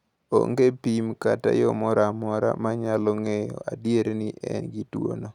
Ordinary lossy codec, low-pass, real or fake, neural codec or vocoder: none; 19.8 kHz; real; none